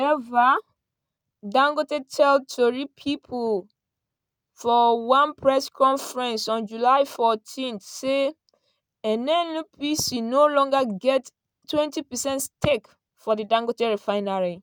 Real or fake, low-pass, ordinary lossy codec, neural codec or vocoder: real; none; none; none